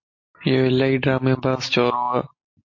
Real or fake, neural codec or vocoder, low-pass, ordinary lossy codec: real; none; 7.2 kHz; MP3, 32 kbps